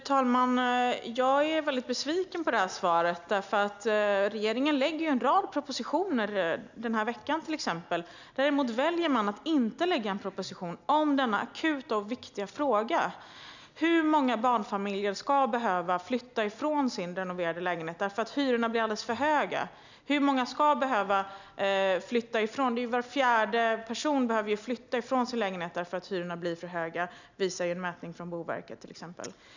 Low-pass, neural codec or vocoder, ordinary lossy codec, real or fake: 7.2 kHz; none; none; real